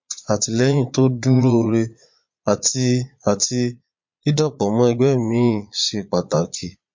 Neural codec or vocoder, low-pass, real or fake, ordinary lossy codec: vocoder, 22.05 kHz, 80 mel bands, Vocos; 7.2 kHz; fake; MP3, 48 kbps